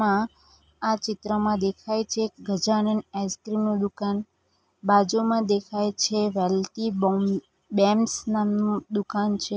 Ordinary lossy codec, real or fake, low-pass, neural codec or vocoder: none; real; none; none